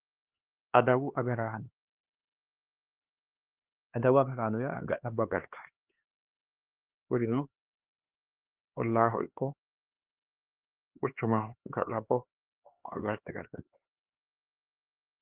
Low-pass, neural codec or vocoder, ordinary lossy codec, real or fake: 3.6 kHz; codec, 16 kHz, 2 kbps, X-Codec, HuBERT features, trained on LibriSpeech; Opus, 16 kbps; fake